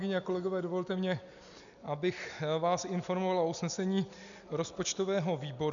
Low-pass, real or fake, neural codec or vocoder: 7.2 kHz; real; none